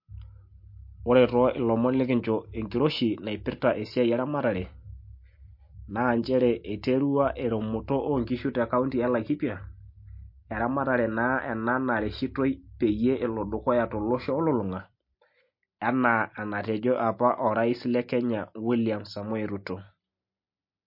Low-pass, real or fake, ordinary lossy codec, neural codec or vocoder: 5.4 kHz; real; MP3, 32 kbps; none